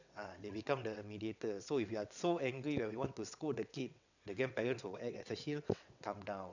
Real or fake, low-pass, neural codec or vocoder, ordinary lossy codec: fake; 7.2 kHz; vocoder, 22.05 kHz, 80 mel bands, WaveNeXt; none